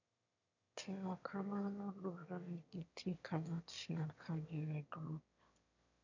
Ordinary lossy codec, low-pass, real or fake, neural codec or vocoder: none; 7.2 kHz; fake; autoencoder, 22.05 kHz, a latent of 192 numbers a frame, VITS, trained on one speaker